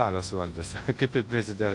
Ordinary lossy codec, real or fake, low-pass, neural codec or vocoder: AAC, 48 kbps; fake; 10.8 kHz; codec, 24 kHz, 0.9 kbps, WavTokenizer, large speech release